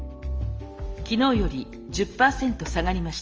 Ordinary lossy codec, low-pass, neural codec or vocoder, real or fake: Opus, 24 kbps; 7.2 kHz; none; real